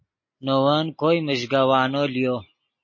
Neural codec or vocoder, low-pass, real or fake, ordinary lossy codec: none; 7.2 kHz; real; MP3, 32 kbps